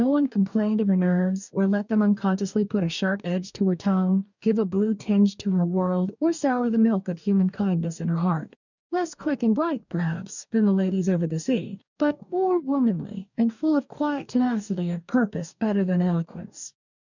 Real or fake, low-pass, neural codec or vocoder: fake; 7.2 kHz; codec, 44.1 kHz, 2.6 kbps, DAC